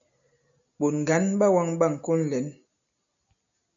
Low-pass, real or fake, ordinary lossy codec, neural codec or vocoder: 7.2 kHz; real; MP3, 96 kbps; none